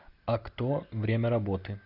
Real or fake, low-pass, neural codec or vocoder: real; 5.4 kHz; none